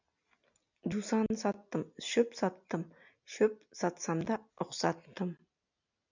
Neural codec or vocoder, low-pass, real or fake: none; 7.2 kHz; real